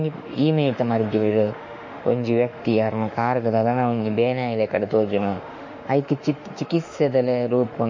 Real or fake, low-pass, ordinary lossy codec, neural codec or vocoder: fake; 7.2 kHz; MP3, 48 kbps; autoencoder, 48 kHz, 32 numbers a frame, DAC-VAE, trained on Japanese speech